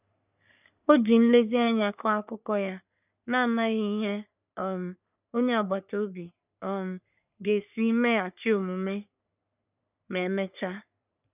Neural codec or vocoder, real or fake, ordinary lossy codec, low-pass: codec, 44.1 kHz, 3.4 kbps, Pupu-Codec; fake; none; 3.6 kHz